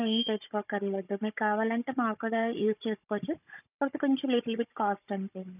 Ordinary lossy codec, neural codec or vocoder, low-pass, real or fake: none; codec, 16 kHz, 16 kbps, FunCodec, trained on Chinese and English, 50 frames a second; 3.6 kHz; fake